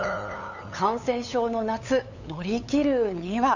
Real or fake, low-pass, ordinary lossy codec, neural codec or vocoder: fake; 7.2 kHz; none; codec, 16 kHz, 8 kbps, FunCodec, trained on LibriTTS, 25 frames a second